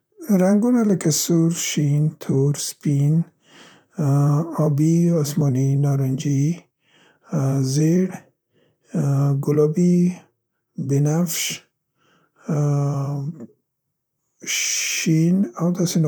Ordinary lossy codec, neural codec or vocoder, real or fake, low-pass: none; vocoder, 44.1 kHz, 128 mel bands, Pupu-Vocoder; fake; none